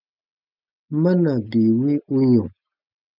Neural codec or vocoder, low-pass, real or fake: none; 5.4 kHz; real